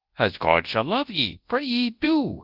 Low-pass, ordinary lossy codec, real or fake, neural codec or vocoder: 5.4 kHz; Opus, 32 kbps; fake; codec, 24 kHz, 0.9 kbps, WavTokenizer, large speech release